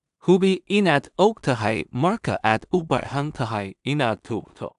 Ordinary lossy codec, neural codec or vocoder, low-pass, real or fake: none; codec, 16 kHz in and 24 kHz out, 0.4 kbps, LongCat-Audio-Codec, two codebook decoder; 10.8 kHz; fake